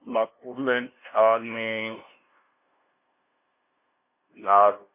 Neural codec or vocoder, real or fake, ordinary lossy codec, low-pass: codec, 16 kHz, 0.5 kbps, FunCodec, trained on LibriTTS, 25 frames a second; fake; MP3, 24 kbps; 3.6 kHz